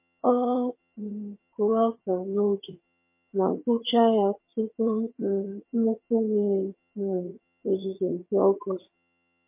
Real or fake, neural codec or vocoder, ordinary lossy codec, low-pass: fake; vocoder, 22.05 kHz, 80 mel bands, HiFi-GAN; MP3, 24 kbps; 3.6 kHz